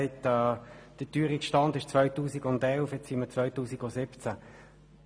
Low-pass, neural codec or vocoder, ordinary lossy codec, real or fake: none; none; none; real